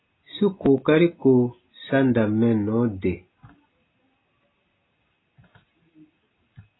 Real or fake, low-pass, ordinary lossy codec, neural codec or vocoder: real; 7.2 kHz; AAC, 16 kbps; none